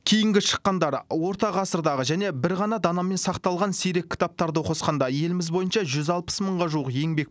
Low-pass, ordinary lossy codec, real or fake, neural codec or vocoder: none; none; real; none